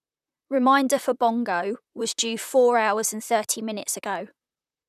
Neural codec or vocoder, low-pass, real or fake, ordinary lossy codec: vocoder, 44.1 kHz, 128 mel bands, Pupu-Vocoder; 14.4 kHz; fake; none